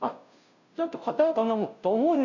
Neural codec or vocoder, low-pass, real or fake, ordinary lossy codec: codec, 16 kHz, 0.5 kbps, FunCodec, trained on Chinese and English, 25 frames a second; 7.2 kHz; fake; none